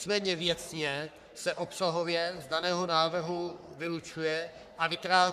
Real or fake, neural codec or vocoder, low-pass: fake; codec, 44.1 kHz, 3.4 kbps, Pupu-Codec; 14.4 kHz